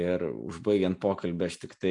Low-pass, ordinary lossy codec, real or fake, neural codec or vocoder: 10.8 kHz; MP3, 64 kbps; real; none